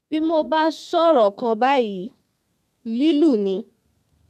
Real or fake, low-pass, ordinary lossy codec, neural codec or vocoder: fake; 14.4 kHz; none; codec, 32 kHz, 1.9 kbps, SNAC